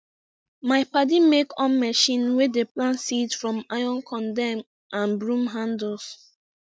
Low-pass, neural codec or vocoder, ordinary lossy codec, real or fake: none; none; none; real